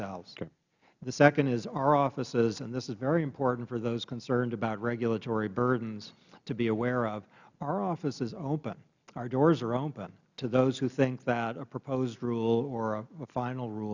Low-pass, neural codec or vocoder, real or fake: 7.2 kHz; none; real